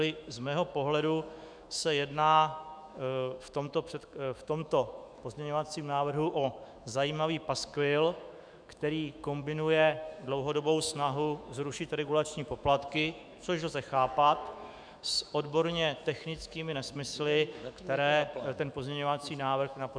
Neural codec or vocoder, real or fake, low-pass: autoencoder, 48 kHz, 128 numbers a frame, DAC-VAE, trained on Japanese speech; fake; 9.9 kHz